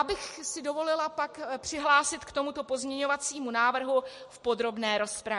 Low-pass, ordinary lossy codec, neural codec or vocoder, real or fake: 14.4 kHz; MP3, 48 kbps; none; real